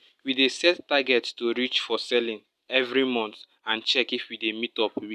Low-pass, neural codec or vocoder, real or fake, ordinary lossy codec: 14.4 kHz; none; real; none